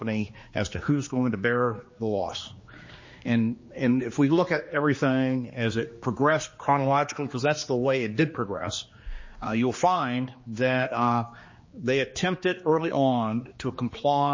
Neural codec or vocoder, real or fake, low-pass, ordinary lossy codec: codec, 16 kHz, 2 kbps, X-Codec, HuBERT features, trained on general audio; fake; 7.2 kHz; MP3, 32 kbps